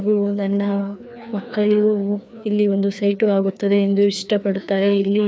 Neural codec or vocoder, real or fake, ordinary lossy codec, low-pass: codec, 16 kHz, 2 kbps, FreqCodec, larger model; fake; none; none